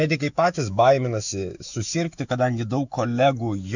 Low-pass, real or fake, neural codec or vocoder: 7.2 kHz; fake; autoencoder, 48 kHz, 128 numbers a frame, DAC-VAE, trained on Japanese speech